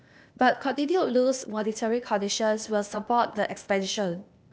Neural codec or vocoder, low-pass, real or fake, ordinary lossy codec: codec, 16 kHz, 0.8 kbps, ZipCodec; none; fake; none